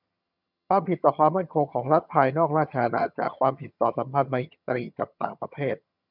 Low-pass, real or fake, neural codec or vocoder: 5.4 kHz; fake; vocoder, 22.05 kHz, 80 mel bands, HiFi-GAN